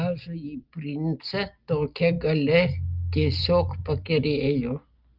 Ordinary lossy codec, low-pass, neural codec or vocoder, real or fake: Opus, 24 kbps; 5.4 kHz; none; real